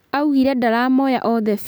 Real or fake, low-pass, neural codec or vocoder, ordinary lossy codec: real; none; none; none